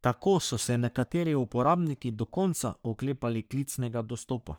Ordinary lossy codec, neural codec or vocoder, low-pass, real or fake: none; codec, 44.1 kHz, 3.4 kbps, Pupu-Codec; none; fake